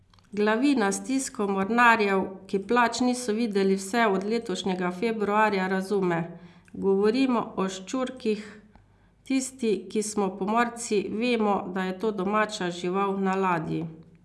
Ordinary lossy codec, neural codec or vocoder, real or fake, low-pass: none; none; real; none